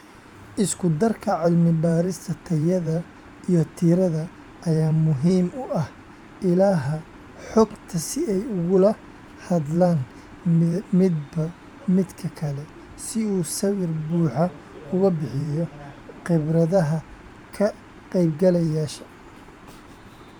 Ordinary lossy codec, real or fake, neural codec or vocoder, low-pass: none; fake; vocoder, 44.1 kHz, 128 mel bands every 512 samples, BigVGAN v2; 19.8 kHz